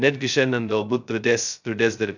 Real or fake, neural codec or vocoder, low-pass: fake; codec, 16 kHz, 0.2 kbps, FocalCodec; 7.2 kHz